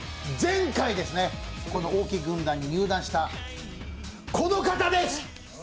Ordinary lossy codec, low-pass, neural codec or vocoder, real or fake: none; none; none; real